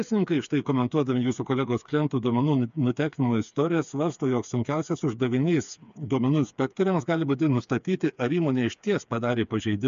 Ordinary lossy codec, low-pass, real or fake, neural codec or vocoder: MP3, 64 kbps; 7.2 kHz; fake; codec, 16 kHz, 4 kbps, FreqCodec, smaller model